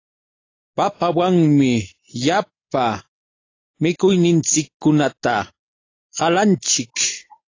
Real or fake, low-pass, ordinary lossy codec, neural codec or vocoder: real; 7.2 kHz; AAC, 32 kbps; none